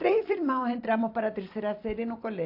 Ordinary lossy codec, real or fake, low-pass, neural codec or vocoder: AAC, 32 kbps; fake; 5.4 kHz; vocoder, 44.1 kHz, 128 mel bands every 256 samples, BigVGAN v2